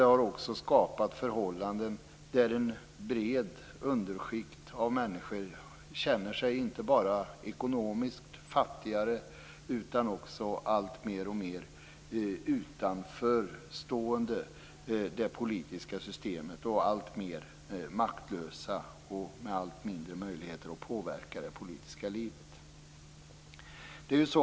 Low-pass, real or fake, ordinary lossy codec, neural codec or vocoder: none; real; none; none